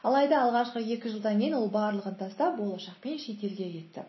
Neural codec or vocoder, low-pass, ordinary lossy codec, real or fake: none; 7.2 kHz; MP3, 24 kbps; real